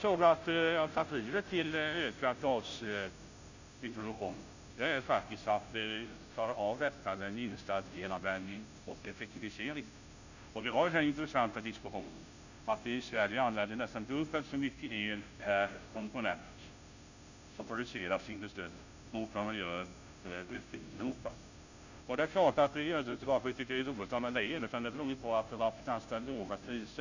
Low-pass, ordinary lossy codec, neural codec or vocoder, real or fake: 7.2 kHz; none; codec, 16 kHz, 0.5 kbps, FunCodec, trained on Chinese and English, 25 frames a second; fake